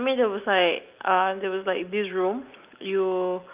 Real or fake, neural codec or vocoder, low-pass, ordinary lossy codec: real; none; 3.6 kHz; Opus, 24 kbps